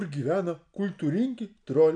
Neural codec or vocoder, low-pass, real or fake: none; 9.9 kHz; real